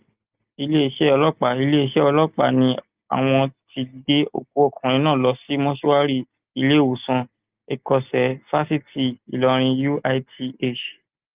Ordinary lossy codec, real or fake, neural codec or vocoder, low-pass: Opus, 32 kbps; real; none; 3.6 kHz